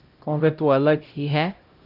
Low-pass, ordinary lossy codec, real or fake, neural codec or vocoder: 5.4 kHz; Opus, 24 kbps; fake; codec, 16 kHz, 0.5 kbps, X-Codec, HuBERT features, trained on LibriSpeech